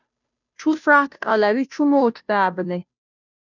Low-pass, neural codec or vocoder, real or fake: 7.2 kHz; codec, 16 kHz, 0.5 kbps, FunCodec, trained on Chinese and English, 25 frames a second; fake